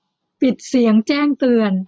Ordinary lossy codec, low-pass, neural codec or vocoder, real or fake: none; none; none; real